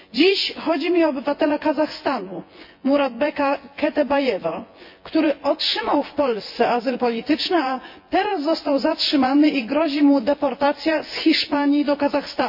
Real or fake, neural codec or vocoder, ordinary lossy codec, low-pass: fake; vocoder, 24 kHz, 100 mel bands, Vocos; MP3, 32 kbps; 5.4 kHz